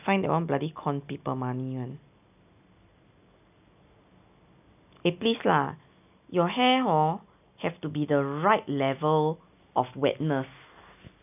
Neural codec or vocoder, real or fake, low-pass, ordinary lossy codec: none; real; 3.6 kHz; none